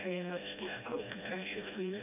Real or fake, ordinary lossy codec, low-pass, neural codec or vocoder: fake; none; 3.6 kHz; codec, 16 kHz, 1 kbps, FreqCodec, smaller model